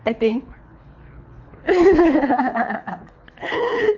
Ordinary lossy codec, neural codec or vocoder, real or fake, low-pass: MP3, 48 kbps; codec, 24 kHz, 3 kbps, HILCodec; fake; 7.2 kHz